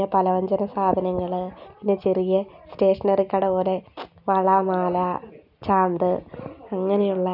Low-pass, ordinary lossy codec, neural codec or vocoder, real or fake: 5.4 kHz; none; none; real